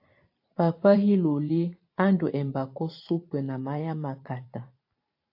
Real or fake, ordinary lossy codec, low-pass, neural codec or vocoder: fake; AAC, 32 kbps; 5.4 kHz; vocoder, 44.1 kHz, 128 mel bands every 512 samples, BigVGAN v2